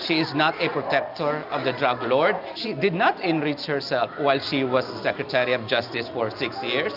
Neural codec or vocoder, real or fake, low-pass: none; real; 5.4 kHz